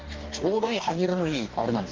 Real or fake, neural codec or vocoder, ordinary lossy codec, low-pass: fake; codec, 44.1 kHz, 2.6 kbps, DAC; Opus, 24 kbps; 7.2 kHz